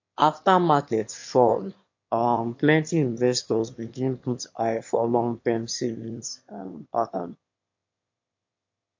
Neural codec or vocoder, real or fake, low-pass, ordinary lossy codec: autoencoder, 22.05 kHz, a latent of 192 numbers a frame, VITS, trained on one speaker; fake; 7.2 kHz; MP3, 48 kbps